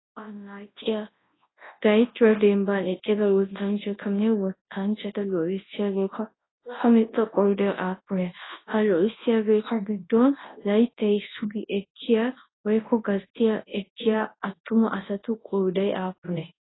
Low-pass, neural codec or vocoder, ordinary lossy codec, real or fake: 7.2 kHz; codec, 24 kHz, 0.9 kbps, WavTokenizer, large speech release; AAC, 16 kbps; fake